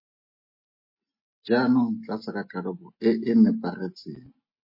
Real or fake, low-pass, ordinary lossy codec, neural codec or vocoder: real; 5.4 kHz; MP3, 24 kbps; none